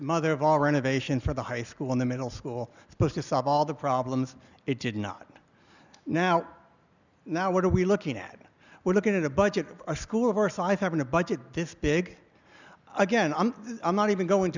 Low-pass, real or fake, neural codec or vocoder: 7.2 kHz; real; none